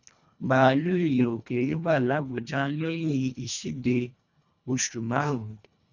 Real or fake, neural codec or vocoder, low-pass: fake; codec, 24 kHz, 1.5 kbps, HILCodec; 7.2 kHz